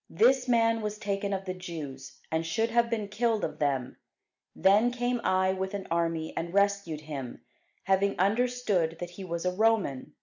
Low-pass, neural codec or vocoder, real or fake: 7.2 kHz; none; real